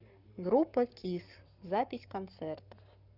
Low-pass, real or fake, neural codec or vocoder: 5.4 kHz; fake; codec, 44.1 kHz, 7.8 kbps, Pupu-Codec